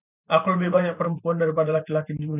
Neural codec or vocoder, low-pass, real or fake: vocoder, 44.1 kHz, 128 mel bands every 512 samples, BigVGAN v2; 3.6 kHz; fake